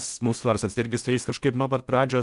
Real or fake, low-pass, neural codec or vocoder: fake; 10.8 kHz; codec, 16 kHz in and 24 kHz out, 0.6 kbps, FocalCodec, streaming, 4096 codes